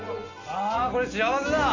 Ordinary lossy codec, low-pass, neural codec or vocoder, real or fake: AAC, 48 kbps; 7.2 kHz; none; real